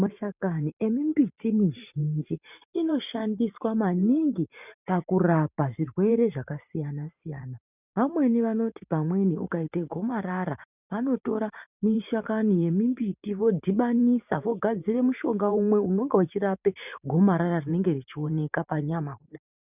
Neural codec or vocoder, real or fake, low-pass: vocoder, 44.1 kHz, 128 mel bands every 512 samples, BigVGAN v2; fake; 3.6 kHz